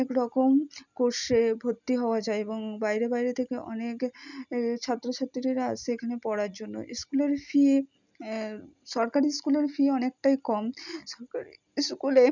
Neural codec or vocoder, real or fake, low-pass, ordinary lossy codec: none; real; 7.2 kHz; none